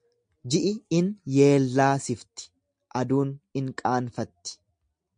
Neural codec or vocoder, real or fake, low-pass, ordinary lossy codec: none; real; 9.9 kHz; MP3, 64 kbps